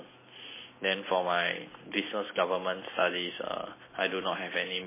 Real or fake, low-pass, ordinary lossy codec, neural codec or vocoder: real; 3.6 kHz; MP3, 16 kbps; none